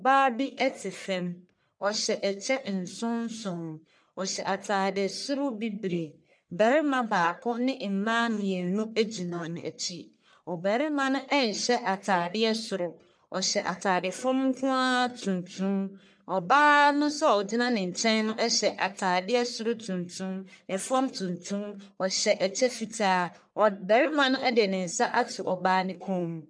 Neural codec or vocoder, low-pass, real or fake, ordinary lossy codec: codec, 44.1 kHz, 1.7 kbps, Pupu-Codec; 9.9 kHz; fake; MP3, 96 kbps